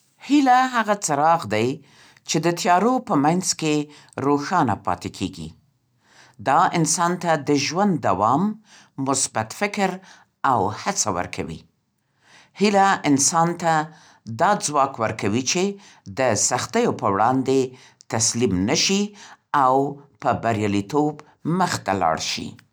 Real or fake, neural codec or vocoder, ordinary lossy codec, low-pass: real; none; none; none